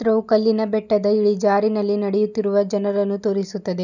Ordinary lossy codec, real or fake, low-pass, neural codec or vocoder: none; real; 7.2 kHz; none